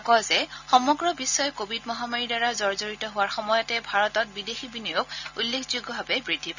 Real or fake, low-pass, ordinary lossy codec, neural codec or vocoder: real; 7.2 kHz; none; none